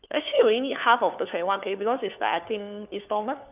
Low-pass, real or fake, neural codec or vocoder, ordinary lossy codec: 3.6 kHz; fake; codec, 16 kHz, 4 kbps, FunCodec, trained on LibriTTS, 50 frames a second; none